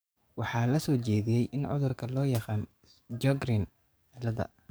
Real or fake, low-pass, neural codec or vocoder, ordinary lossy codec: fake; none; codec, 44.1 kHz, 7.8 kbps, DAC; none